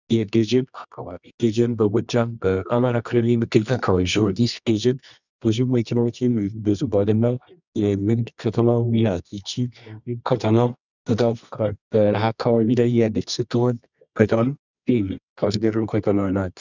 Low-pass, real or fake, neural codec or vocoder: 7.2 kHz; fake; codec, 24 kHz, 0.9 kbps, WavTokenizer, medium music audio release